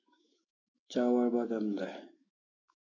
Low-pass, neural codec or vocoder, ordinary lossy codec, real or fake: 7.2 kHz; autoencoder, 48 kHz, 128 numbers a frame, DAC-VAE, trained on Japanese speech; AAC, 32 kbps; fake